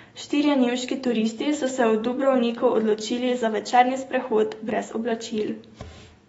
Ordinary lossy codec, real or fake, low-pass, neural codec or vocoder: AAC, 24 kbps; fake; 19.8 kHz; autoencoder, 48 kHz, 128 numbers a frame, DAC-VAE, trained on Japanese speech